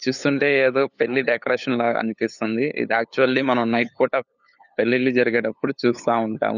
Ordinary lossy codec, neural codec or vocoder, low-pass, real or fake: none; codec, 16 kHz, 8 kbps, FunCodec, trained on LibriTTS, 25 frames a second; 7.2 kHz; fake